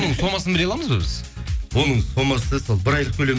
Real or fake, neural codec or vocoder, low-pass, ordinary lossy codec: real; none; none; none